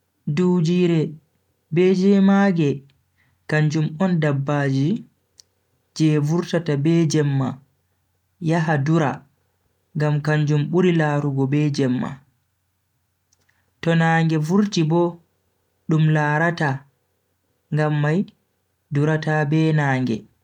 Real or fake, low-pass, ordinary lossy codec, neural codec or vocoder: real; 19.8 kHz; none; none